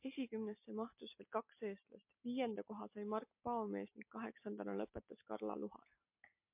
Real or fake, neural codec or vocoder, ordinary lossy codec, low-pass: real; none; MP3, 32 kbps; 3.6 kHz